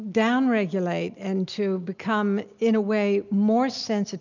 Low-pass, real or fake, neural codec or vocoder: 7.2 kHz; real; none